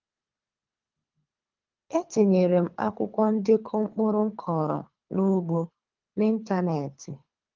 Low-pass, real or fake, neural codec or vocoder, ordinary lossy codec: 7.2 kHz; fake; codec, 24 kHz, 3 kbps, HILCodec; Opus, 32 kbps